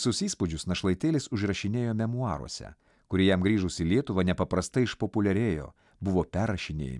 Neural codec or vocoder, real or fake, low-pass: none; real; 10.8 kHz